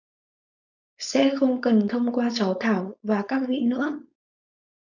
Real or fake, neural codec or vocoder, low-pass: fake; codec, 16 kHz, 4.8 kbps, FACodec; 7.2 kHz